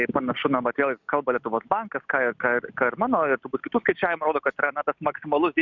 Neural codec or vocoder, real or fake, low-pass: none; real; 7.2 kHz